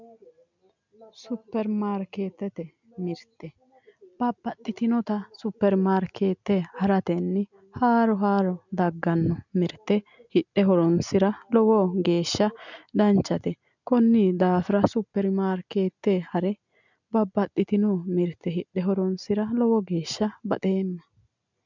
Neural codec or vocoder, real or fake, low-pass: none; real; 7.2 kHz